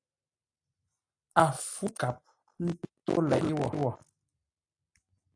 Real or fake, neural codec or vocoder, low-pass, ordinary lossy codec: real; none; 9.9 kHz; AAC, 64 kbps